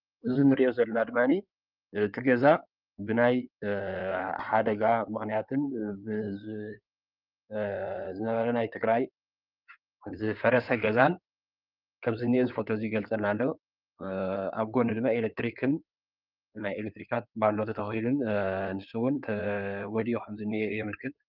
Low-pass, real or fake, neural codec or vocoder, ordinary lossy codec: 5.4 kHz; fake; codec, 16 kHz in and 24 kHz out, 2.2 kbps, FireRedTTS-2 codec; Opus, 24 kbps